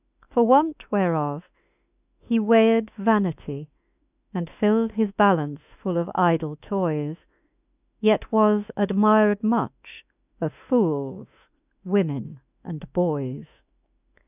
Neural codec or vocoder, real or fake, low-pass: autoencoder, 48 kHz, 32 numbers a frame, DAC-VAE, trained on Japanese speech; fake; 3.6 kHz